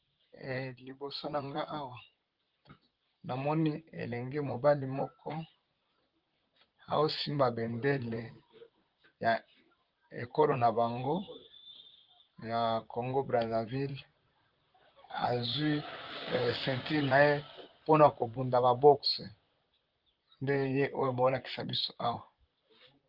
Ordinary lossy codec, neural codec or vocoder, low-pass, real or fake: Opus, 32 kbps; vocoder, 44.1 kHz, 128 mel bands, Pupu-Vocoder; 5.4 kHz; fake